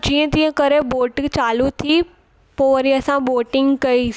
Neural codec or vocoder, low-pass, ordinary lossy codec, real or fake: none; none; none; real